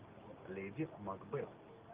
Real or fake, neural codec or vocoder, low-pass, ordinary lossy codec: real; none; 3.6 kHz; Opus, 32 kbps